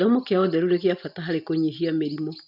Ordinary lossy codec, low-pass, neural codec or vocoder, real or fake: none; 5.4 kHz; none; real